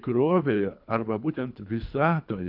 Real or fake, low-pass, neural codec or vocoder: fake; 5.4 kHz; codec, 24 kHz, 3 kbps, HILCodec